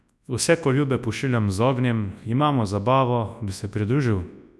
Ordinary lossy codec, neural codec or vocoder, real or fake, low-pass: none; codec, 24 kHz, 0.9 kbps, WavTokenizer, large speech release; fake; none